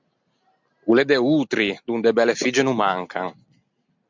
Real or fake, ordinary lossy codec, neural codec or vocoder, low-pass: real; MP3, 64 kbps; none; 7.2 kHz